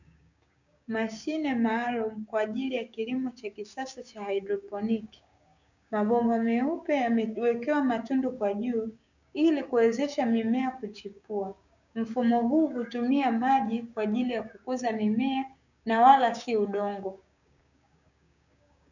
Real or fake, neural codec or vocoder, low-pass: fake; codec, 16 kHz, 6 kbps, DAC; 7.2 kHz